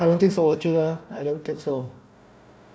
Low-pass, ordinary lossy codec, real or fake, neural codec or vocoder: none; none; fake; codec, 16 kHz, 1 kbps, FunCodec, trained on Chinese and English, 50 frames a second